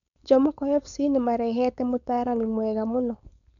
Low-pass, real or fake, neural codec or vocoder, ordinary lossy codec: 7.2 kHz; fake; codec, 16 kHz, 4.8 kbps, FACodec; none